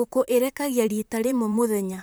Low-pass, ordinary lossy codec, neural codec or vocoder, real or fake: none; none; vocoder, 44.1 kHz, 128 mel bands, Pupu-Vocoder; fake